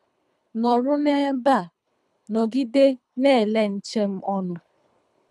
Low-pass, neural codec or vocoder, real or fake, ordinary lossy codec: none; codec, 24 kHz, 3 kbps, HILCodec; fake; none